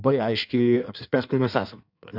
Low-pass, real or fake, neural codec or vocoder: 5.4 kHz; fake; codec, 16 kHz in and 24 kHz out, 1.1 kbps, FireRedTTS-2 codec